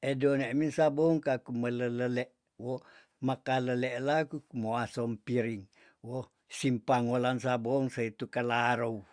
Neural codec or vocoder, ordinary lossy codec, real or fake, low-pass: none; Opus, 64 kbps; real; 9.9 kHz